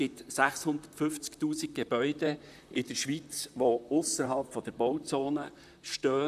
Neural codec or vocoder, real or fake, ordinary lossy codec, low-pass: vocoder, 44.1 kHz, 128 mel bands, Pupu-Vocoder; fake; none; 14.4 kHz